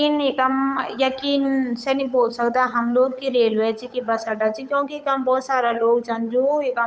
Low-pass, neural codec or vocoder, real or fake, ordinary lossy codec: none; codec, 16 kHz, 8 kbps, FunCodec, trained on Chinese and English, 25 frames a second; fake; none